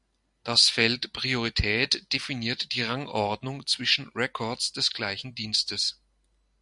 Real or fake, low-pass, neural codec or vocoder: real; 10.8 kHz; none